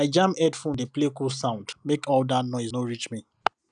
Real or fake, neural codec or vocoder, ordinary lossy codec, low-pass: real; none; none; 9.9 kHz